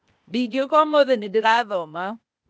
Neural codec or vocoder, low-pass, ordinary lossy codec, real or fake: codec, 16 kHz, 0.8 kbps, ZipCodec; none; none; fake